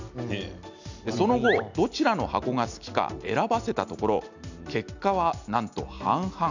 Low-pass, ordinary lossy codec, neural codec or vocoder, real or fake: 7.2 kHz; none; none; real